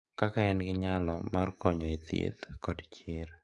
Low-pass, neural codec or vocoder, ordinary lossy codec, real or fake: 10.8 kHz; codec, 44.1 kHz, 7.8 kbps, DAC; AAC, 64 kbps; fake